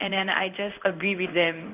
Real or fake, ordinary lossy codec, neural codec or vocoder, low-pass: fake; none; codec, 16 kHz in and 24 kHz out, 1 kbps, XY-Tokenizer; 3.6 kHz